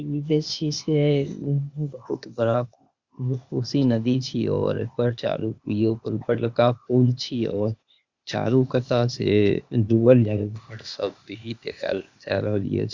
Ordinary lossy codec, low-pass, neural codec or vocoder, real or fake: Opus, 64 kbps; 7.2 kHz; codec, 16 kHz, 0.8 kbps, ZipCodec; fake